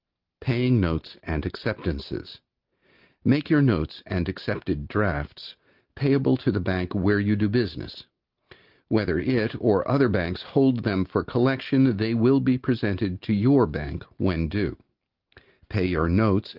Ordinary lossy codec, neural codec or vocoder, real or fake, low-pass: Opus, 24 kbps; none; real; 5.4 kHz